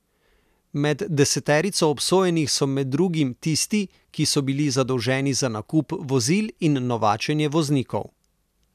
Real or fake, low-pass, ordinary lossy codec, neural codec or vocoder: real; 14.4 kHz; none; none